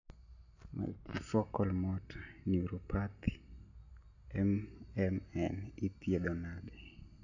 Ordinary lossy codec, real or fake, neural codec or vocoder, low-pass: AAC, 48 kbps; real; none; 7.2 kHz